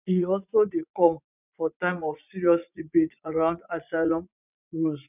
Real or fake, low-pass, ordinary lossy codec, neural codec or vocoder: fake; 3.6 kHz; none; vocoder, 44.1 kHz, 80 mel bands, Vocos